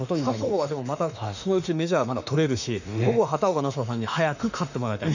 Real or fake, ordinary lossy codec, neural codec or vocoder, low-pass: fake; none; autoencoder, 48 kHz, 32 numbers a frame, DAC-VAE, trained on Japanese speech; 7.2 kHz